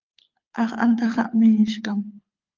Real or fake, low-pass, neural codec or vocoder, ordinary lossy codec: fake; 7.2 kHz; codec, 24 kHz, 6 kbps, HILCodec; Opus, 32 kbps